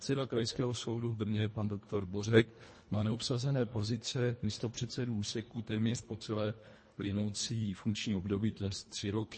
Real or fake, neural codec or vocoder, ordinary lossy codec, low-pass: fake; codec, 24 kHz, 1.5 kbps, HILCodec; MP3, 32 kbps; 9.9 kHz